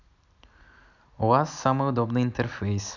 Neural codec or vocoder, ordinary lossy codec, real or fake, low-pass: none; none; real; 7.2 kHz